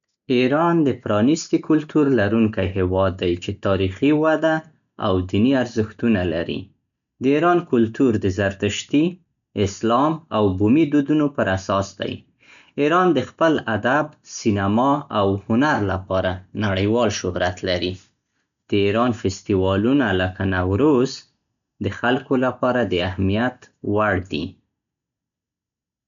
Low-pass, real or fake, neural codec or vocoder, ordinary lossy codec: 7.2 kHz; real; none; none